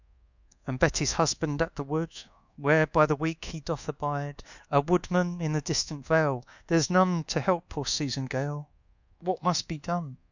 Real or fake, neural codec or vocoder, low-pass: fake; codec, 24 kHz, 1.2 kbps, DualCodec; 7.2 kHz